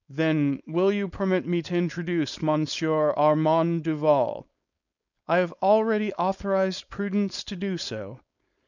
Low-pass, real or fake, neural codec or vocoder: 7.2 kHz; fake; codec, 16 kHz, 4.8 kbps, FACodec